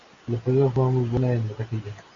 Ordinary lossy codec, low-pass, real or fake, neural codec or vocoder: AAC, 32 kbps; 7.2 kHz; real; none